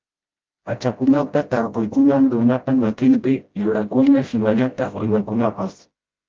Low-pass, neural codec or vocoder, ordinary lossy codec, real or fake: 7.2 kHz; codec, 16 kHz, 0.5 kbps, FreqCodec, smaller model; Opus, 24 kbps; fake